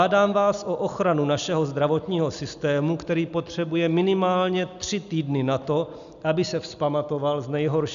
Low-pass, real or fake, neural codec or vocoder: 7.2 kHz; real; none